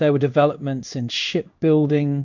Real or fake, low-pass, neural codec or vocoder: fake; 7.2 kHz; codec, 16 kHz in and 24 kHz out, 1 kbps, XY-Tokenizer